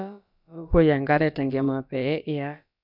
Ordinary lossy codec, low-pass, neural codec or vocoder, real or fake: none; 5.4 kHz; codec, 16 kHz, about 1 kbps, DyCAST, with the encoder's durations; fake